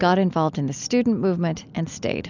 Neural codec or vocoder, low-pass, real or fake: none; 7.2 kHz; real